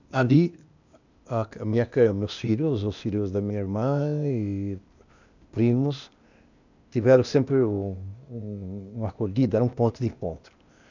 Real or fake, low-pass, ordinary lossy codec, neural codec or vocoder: fake; 7.2 kHz; none; codec, 16 kHz, 0.8 kbps, ZipCodec